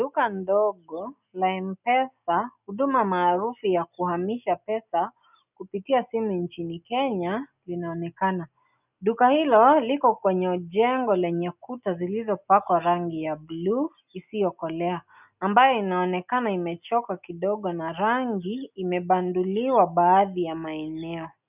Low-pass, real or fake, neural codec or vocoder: 3.6 kHz; real; none